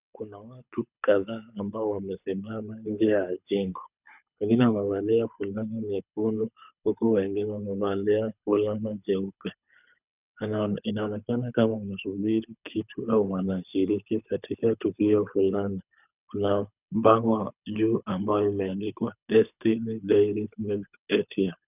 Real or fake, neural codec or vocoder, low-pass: fake; codec, 24 kHz, 6 kbps, HILCodec; 3.6 kHz